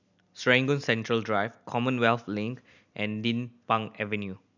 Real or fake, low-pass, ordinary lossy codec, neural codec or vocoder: real; 7.2 kHz; none; none